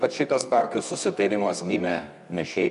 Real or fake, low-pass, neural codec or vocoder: fake; 10.8 kHz; codec, 24 kHz, 0.9 kbps, WavTokenizer, medium music audio release